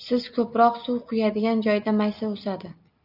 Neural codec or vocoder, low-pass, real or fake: none; 5.4 kHz; real